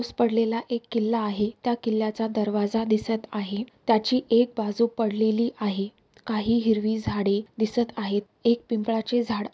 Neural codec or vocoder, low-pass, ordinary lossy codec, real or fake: none; none; none; real